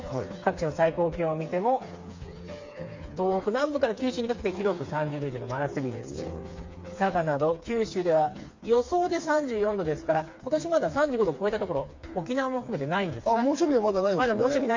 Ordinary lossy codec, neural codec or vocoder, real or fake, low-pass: MP3, 48 kbps; codec, 16 kHz, 4 kbps, FreqCodec, smaller model; fake; 7.2 kHz